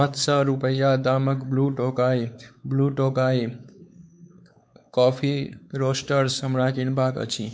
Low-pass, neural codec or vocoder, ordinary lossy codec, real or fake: none; codec, 16 kHz, 4 kbps, X-Codec, WavLM features, trained on Multilingual LibriSpeech; none; fake